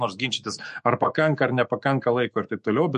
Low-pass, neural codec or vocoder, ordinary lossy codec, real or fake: 14.4 kHz; autoencoder, 48 kHz, 128 numbers a frame, DAC-VAE, trained on Japanese speech; MP3, 48 kbps; fake